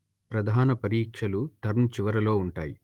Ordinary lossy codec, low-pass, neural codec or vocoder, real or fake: Opus, 24 kbps; 19.8 kHz; autoencoder, 48 kHz, 128 numbers a frame, DAC-VAE, trained on Japanese speech; fake